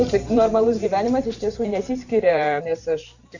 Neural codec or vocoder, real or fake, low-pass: vocoder, 44.1 kHz, 128 mel bands every 512 samples, BigVGAN v2; fake; 7.2 kHz